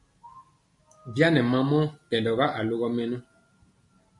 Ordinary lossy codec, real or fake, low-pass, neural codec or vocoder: MP3, 48 kbps; real; 10.8 kHz; none